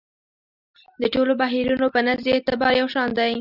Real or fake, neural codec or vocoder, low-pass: real; none; 5.4 kHz